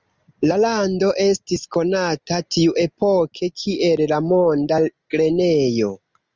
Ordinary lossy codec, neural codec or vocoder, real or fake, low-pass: Opus, 32 kbps; none; real; 7.2 kHz